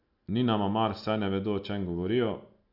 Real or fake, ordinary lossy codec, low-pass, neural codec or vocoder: real; none; 5.4 kHz; none